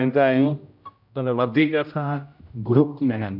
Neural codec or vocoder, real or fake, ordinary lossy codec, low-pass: codec, 16 kHz, 0.5 kbps, X-Codec, HuBERT features, trained on general audio; fake; AAC, 48 kbps; 5.4 kHz